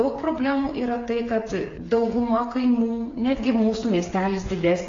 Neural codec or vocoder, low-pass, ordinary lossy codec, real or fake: codec, 16 kHz, 8 kbps, FreqCodec, smaller model; 7.2 kHz; AAC, 32 kbps; fake